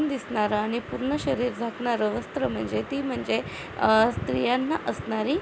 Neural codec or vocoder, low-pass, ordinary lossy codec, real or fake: none; none; none; real